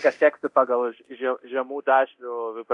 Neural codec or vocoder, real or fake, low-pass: codec, 24 kHz, 0.9 kbps, DualCodec; fake; 10.8 kHz